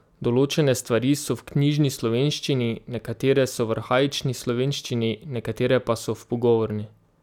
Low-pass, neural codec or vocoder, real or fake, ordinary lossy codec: 19.8 kHz; none; real; none